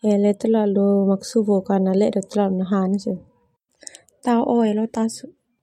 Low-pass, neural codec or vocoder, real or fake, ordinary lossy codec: 19.8 kHz; none; real; MP3, 64 kbps